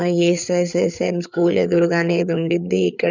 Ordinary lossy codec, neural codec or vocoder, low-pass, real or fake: none; codec, 16 kHz, 4 kbps, FreqCodec, larger model; 7.2 kHz; fake